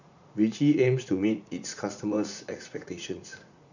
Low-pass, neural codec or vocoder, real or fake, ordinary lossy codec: 7.2 kHz; vocoder, 44.1 kHz, 80 mel bands, Vocos; fake; none